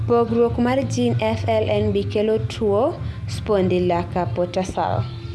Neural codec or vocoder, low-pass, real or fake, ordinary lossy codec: none; none; real; none